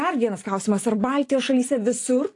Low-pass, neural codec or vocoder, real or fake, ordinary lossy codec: 10.8 kHz; none; real; AAC, 48 kbps